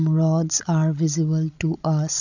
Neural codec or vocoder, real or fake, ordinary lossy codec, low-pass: codec, 16 kHz, 16 kbps, FreqCodec, larger model; fake; none; 7.2 kHz